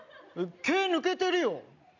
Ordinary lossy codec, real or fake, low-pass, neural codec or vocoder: none; real; 7.2 kHz; none